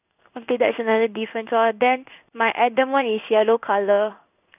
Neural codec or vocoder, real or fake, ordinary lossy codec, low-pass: codec, 16 kHz in and 24 kHz out, 1 kbps, XY-Tokenizer; fake; none; 3.6 kHz